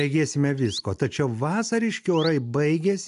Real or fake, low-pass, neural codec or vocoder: real; 10.8 kHz; none